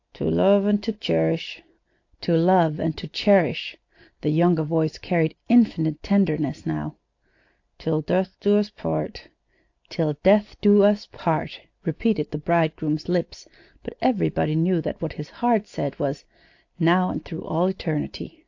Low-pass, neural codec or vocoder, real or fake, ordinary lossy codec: 7.2 kHz; none; real; AAC, 48 kbps